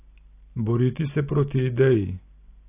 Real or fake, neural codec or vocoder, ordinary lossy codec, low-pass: real; none; AAC, 24 kbps; 3.6 kHz